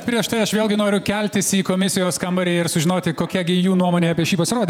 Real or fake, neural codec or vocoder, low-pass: fake; vocoder, 48 kHz, 128 mel bands, Vocos; 19.8 kHz